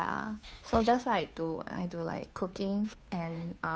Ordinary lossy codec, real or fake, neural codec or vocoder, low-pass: none; fake; codec, 16 kHz, 2 kbps, FunCodec, trained on Chinese and English, 25 frames a second; none